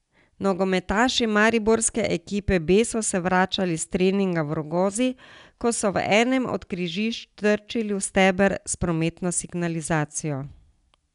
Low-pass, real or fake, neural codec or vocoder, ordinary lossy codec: 10.8 kHz; real; none; none